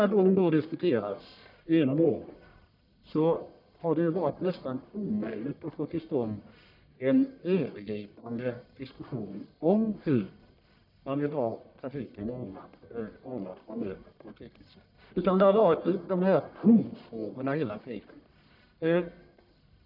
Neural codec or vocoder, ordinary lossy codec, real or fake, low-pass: codec, 44.1 kHz, 1.7 kbps, Pupu-Codec; none; fake; 5.4 kHz